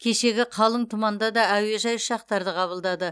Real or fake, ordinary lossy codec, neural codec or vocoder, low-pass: real; none; none; none